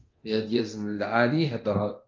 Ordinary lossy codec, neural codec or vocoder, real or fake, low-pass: Opus, 32 kbps; codec, 24 kHz, 0.9 kbps, DualCodec; fake; 7.2 kHz